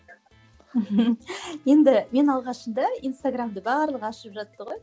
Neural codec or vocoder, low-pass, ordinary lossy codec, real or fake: none; none; none; real